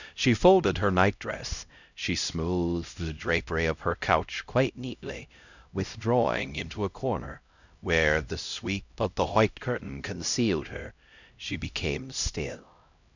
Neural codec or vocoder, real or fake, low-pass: codec, 16 kHz, 0.5 kbps, X-Codec, HuBERT features, trained on LibriSpeech; fake; 7.2 kHz